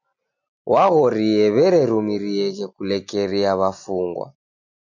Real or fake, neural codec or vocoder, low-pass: real; none; 7.2 kHz